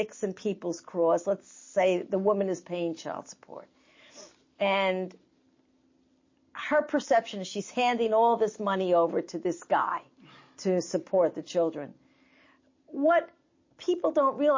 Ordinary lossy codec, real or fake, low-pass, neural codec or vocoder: MP3, 32 kbps; real; 7.2 kHz; none